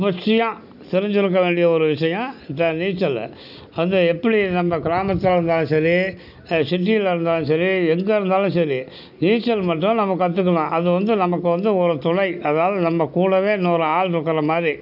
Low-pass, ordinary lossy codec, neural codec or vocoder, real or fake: 5.4 kHz; none; none; real